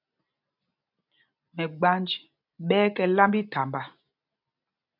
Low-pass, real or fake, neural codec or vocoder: 5.4 kHz; real; none